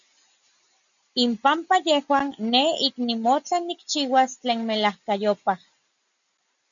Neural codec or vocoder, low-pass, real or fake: none; 7.2 kHz; real